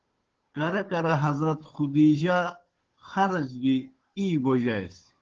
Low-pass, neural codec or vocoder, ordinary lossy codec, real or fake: 7.2 kHz; codec, 16 kHz, 2 kbps, FunCodec, trained on Chinese and English, 25 frames a second; Opus, 16 kbps; fake